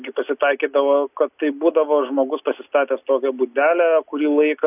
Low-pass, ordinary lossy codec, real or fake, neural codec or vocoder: 3.6 kHz; AAC, 32 kbps; real; none